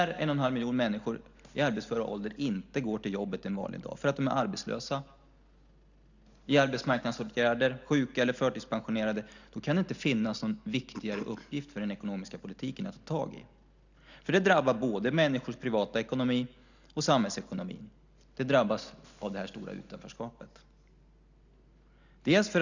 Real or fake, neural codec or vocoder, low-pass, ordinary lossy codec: real; none; 7.2 kHz; none